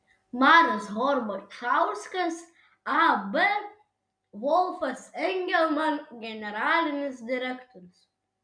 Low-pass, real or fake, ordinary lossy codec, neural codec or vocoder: 9.9 kHz; real; MP3, 96 kbps; none